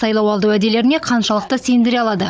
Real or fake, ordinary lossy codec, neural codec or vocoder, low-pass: fake; none; codec, 16 kHz, 16 kbps, FunCodec, trained on Chinese and English, 50 frames a second; none